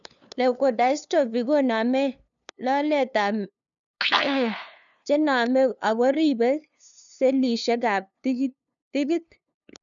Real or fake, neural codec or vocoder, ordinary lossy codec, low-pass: fake; codec, 16 kHz, 2 kbps, FunCodec, trained on LibriTTS, 25 frames a second; none; 7.2 kHz